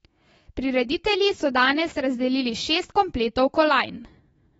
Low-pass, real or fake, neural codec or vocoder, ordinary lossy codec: 19.8 kHz; fake; autoencoder, 48 kHz, 128 numbers a frame, DAC-VAE, trained on Japanese speech; AAC, 24 kbps